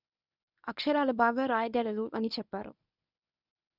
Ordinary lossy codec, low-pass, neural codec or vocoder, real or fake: none; 5.4 kHz; codec, 24 kHz, 0.9 kbps, WavTokenizer, medium speech release version 1; fake